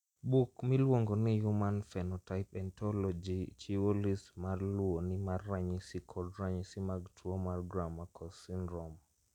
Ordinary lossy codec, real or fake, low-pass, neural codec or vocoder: none; real; 19.8 kHz; none